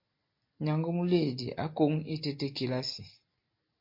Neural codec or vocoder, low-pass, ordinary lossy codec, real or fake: none; 5.4 kHz; MP3, 32 kbps; real